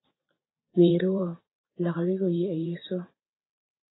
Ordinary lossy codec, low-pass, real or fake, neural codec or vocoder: AAC, 16 kbps; 7.2 kHz; fake; vocoder, 44.1 kHz, 128 mel bands every 512 samples, BigVGAN v2